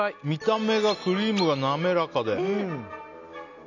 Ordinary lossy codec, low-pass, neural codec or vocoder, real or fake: none; 7.2 kHz; none; real